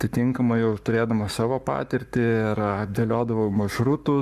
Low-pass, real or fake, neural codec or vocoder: 14.4 kHz; fake; codec, 44.1 kHz, 7.8 kbps, Pupu-Codec